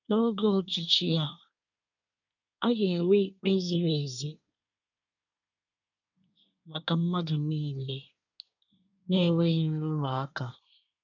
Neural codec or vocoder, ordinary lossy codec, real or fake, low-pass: codec, 24 kHz, 1 kbps, SNAC; none; fake; 7.2 kHz